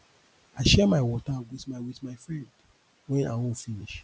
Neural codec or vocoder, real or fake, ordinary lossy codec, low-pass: none; real; none; none